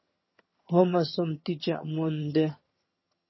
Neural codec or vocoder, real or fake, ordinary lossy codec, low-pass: vocoder, 22.05 kHz, 80 mel bands, HiFi-GAN; fake; MP3, 24 kbps; 7.2 kHz